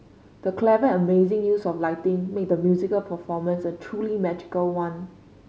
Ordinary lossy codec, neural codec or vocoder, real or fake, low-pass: none; none; real; none